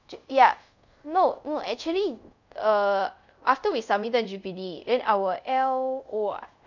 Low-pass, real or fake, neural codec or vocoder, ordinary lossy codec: 7.2 kHz; fake; codec, 24 kHz, 0.5 kbps, DualCodec; none